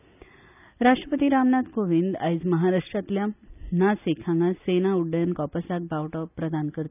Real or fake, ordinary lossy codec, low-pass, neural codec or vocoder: real; none; 3.6 kHz; none